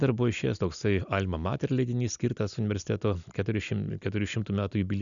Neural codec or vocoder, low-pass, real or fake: none; 7.2 kHz; real